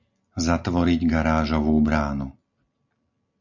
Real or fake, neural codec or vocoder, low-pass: real; none; 7.2 kHz